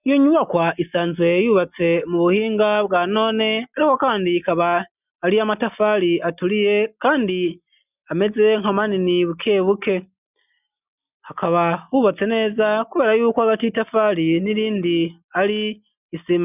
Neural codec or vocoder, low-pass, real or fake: none; 3.6 kHz; real